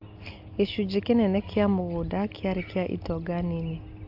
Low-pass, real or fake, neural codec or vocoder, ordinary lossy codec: 5.4 kHz; real; none; AAC, 48 kbps